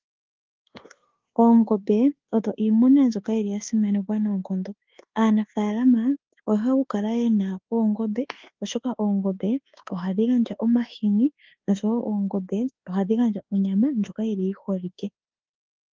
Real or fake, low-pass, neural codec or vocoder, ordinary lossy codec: fake; 7.2 kHz; codec, 24 kHz, 1.2 kbps, DualCodec; Opus, 16 kbps